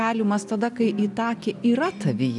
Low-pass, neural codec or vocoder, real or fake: 10.8 kHz; none; real